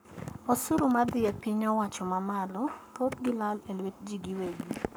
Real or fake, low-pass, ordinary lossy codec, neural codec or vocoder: fake; none; none; codec, 44.1 kHz, 7.8 kbps, Pupu-Codec